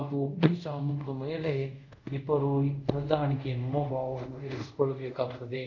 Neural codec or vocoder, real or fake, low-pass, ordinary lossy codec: codec, 24 kHz, 0.5 kbps, DualCodec; fake; 7.2 kHz; none